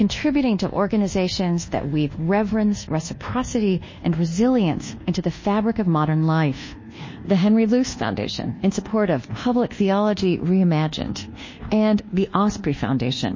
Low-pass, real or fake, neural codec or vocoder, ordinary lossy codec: 7.2 kHz; fake; codec, 24 kHz, 1.2 kbps, DualCodec; MP3, 32 kbps